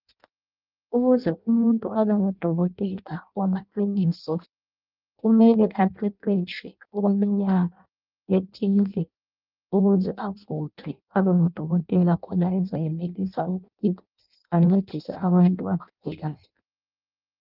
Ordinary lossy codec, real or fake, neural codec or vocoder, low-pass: Opus, 24 kbps; fake; codec, 16 kHz in and 24 kHz out, 0.6 kbps, FireRedTTS-2 codec; 5.4 kHz